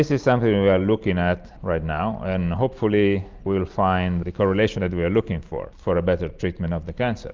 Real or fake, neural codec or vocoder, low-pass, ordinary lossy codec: real; none; 7.2 kHz; Opus, 32 kbps